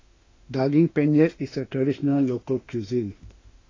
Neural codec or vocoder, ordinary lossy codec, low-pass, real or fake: autoencoder, 48 kHz, 32 numbers a frame, DAC-VAE, trained on Japanese speech; AAC, 32 kbps; 7.2 kHz; fake